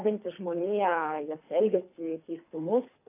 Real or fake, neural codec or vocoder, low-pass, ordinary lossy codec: fake; codec, 24 kHz, 3 kbps, HILCodec; 3.6 kHz; AAC, 24 kbps